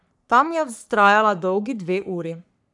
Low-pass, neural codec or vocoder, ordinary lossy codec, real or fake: 10.8 kHz; codec, 44.1 kHz, 3.4 kbps, Pupu-Codec; none; fake